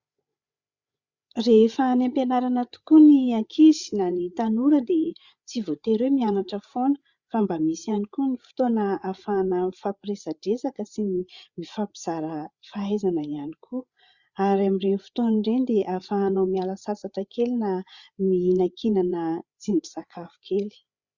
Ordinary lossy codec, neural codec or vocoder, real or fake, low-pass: Opus, 64 kbps; codec, 16 kHz, 8 kbps, FreqCodec, larger model; fake; 7.2 kHz